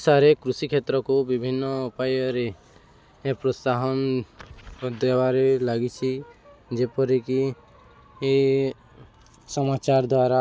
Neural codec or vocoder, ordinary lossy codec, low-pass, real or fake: none; none; none; real